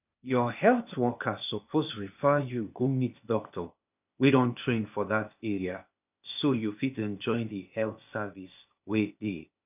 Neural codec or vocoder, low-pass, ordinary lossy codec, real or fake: codec, 16 kHz, 0.8 kbps, ZipCodec; 3.6 kHz; none; fake